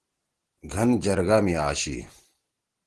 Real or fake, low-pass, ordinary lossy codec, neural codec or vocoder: real; 10.8 kHz; Opus, 16 kbps; none